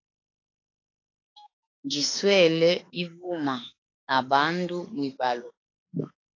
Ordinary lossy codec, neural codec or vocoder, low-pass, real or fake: AAC, 32 kbps; autoencoder, 48 kHz, 32 numbers a frame, DAC-VAE, trained on Japanese speech; 7.2 kHz; fake